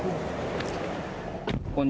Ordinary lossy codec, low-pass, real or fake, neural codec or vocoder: none; none; real; none